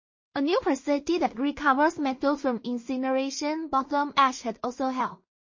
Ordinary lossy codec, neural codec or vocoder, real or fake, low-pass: MP3, 32 kbps; codec, 16 kHz in and 24 kHz out, 0.4 kbps, LongCat-Audio-Codec, two codebook decoder; fake; 7.2 kHz